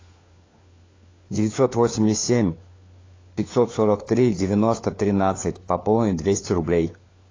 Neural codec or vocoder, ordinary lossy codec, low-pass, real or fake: codec, 16 kHz, 4 kbps, FunCodec, trained on LibriTTS, 50 frames a second; AAC, 32 kbps; 7.2 kHz; fake